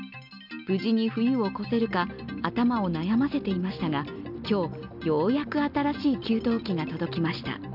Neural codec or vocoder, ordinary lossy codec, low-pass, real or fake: none; none; 5.4 kHz; real